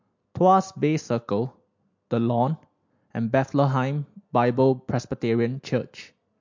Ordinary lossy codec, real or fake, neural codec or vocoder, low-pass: MP3, 48 kbps; real; none; 7.2 kHz